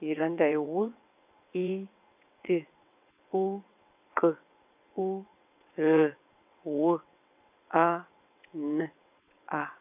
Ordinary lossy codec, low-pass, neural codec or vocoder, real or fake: none; 3.6 kHz; vocoder, 22.05 kHz, 80 mel bands, WaveNeXt; fake